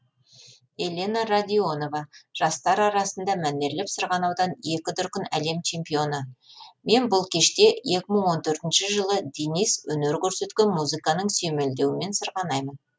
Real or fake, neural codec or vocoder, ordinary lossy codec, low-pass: real; none; none; none